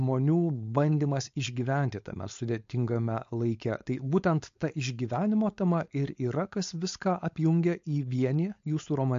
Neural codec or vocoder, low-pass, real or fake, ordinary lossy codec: codec, 16 kHz, 4.8 kbps, FACodec; 7.2 kHz; fake; MP3, 48 kbps